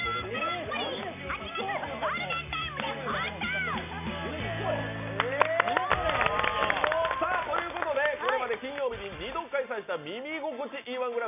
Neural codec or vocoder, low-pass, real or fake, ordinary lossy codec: none; 3.6 kHz; real; none